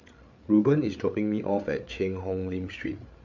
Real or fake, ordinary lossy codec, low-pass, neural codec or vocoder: fake; MP3, 64 kbps; 7.2 kHz; codec, 16 kHz, 8 kbps, FreqCodec, larger model